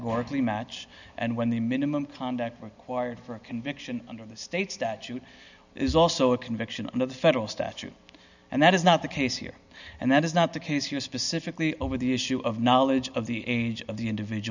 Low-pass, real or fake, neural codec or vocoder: 7.2 kHz; real; none